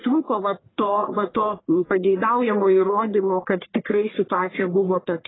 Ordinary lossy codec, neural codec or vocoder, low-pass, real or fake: AAC, 16 kbps; codec, 44.1 kHz, 1.7 kbps, Pupu-Codec; 7.2 kHz; fake